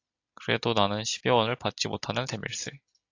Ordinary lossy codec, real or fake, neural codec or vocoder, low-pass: AAC, 48 kbps; real; none; 7.2 kHz